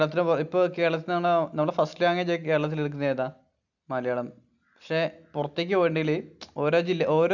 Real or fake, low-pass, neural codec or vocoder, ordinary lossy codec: real; 7.2 kHz; none; none